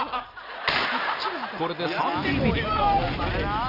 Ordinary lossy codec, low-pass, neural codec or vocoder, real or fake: none; 5.4 kHz; none; real